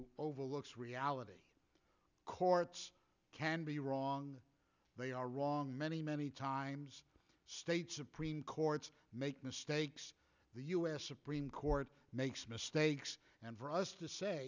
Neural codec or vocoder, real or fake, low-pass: none; real; 7.2 kHz